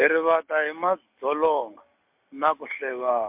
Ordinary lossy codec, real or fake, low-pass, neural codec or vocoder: MP3, 32 kbps; real; 3.6 kHz; none